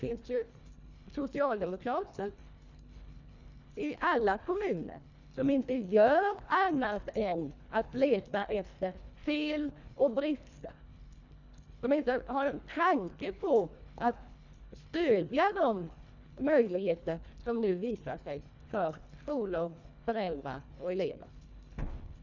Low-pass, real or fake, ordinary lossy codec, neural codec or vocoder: 7.2 kHz; fake; none; codec, 24 kHz, 1.5 kbps, HILCodec